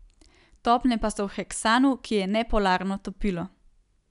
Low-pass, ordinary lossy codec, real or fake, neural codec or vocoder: 10.8 kHz; none; real; none